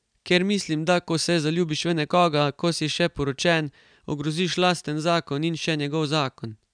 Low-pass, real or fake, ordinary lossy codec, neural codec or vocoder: 9.9 kHz; real; none; none